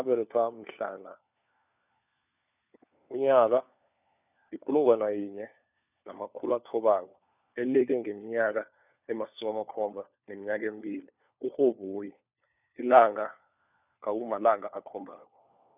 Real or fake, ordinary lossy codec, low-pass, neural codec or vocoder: fake; none; 3.6 kHz; codec, 16 kHz, 4 kbps, FunCodec, trained on LibriTTS, 50 frames a second